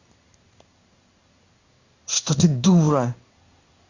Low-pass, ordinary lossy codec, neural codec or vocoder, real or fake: 7.2 kHz; Opus, 64 kbps; codec, 16 kHz in and 24 kHz out, 1 kbps, XY-Tokenizer; fake